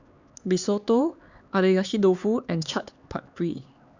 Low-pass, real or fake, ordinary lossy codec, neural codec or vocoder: 7.2 kHz; fake; Opus, 64 kbps; codec, 16 kHz, 4 kbps, X-Codec, HuBERT features, trained on LibriSpeech